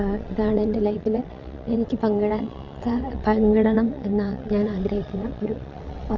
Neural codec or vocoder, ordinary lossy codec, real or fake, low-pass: vocoder, 22.05 kHz, 80 mel bands, WaveNeXt; none; fake; 7.2 kHz